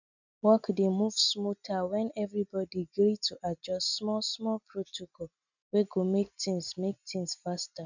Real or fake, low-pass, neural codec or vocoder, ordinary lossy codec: real; 7.2 kHz; none; none